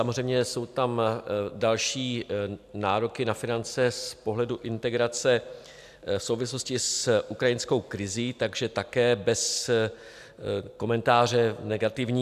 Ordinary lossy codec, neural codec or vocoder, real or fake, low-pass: AAC, 96 kbps; none; real; 14.4 kHz